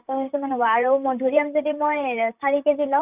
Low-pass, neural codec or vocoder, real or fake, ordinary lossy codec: 3.6 kHz; vocoder, 44.1 kHz, 128 mel bands, Pupu-Vocoder; fake; none